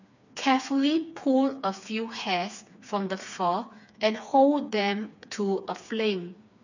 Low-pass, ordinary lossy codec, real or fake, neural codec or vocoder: 7.2 kHz; none; fake; codec, 16 kHz, 4 kbps, FreqCodec, smaller model